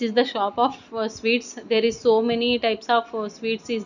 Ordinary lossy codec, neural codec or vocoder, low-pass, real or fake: none; none; 7.2 kHz; real